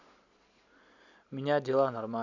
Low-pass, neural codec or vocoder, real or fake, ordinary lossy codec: 7.2 kHz; none; real; none